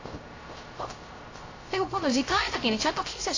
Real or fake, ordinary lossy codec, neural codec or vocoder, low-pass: fake; AAC, 32 kbps; codec, 16 kHz, 0.3 kbps, FocalCodec; 7.2 kHz